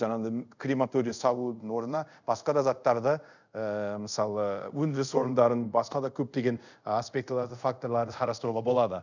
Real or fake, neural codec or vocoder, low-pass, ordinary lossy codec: fake; codec, 24 kHz, 0.5 kbps, DualCodec; 7.2 kHz; none